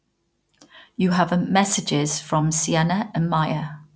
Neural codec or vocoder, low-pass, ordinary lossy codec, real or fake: none; none; none; real